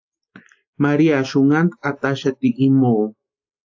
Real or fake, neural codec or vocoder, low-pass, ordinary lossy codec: real; none; 7.2 kHz; AAC, 48 kbps